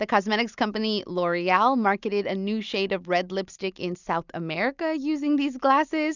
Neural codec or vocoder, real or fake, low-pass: none; real; 7.2 kHz